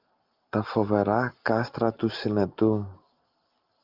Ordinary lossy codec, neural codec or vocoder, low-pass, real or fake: Opus, 24 kbps; none; 5.4 kHz; real